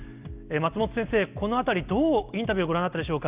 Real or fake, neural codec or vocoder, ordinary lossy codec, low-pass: real; none; Opus, 64 kbps; 3.6 kHz